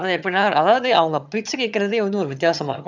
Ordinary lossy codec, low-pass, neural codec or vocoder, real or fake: none; 7.2 kHz; vocoder, 22.05 kHz, 80 mel bands, HiFi-GAN; fake